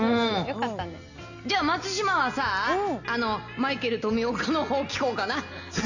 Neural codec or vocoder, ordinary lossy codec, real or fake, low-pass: none; none; real; 7.2 kHz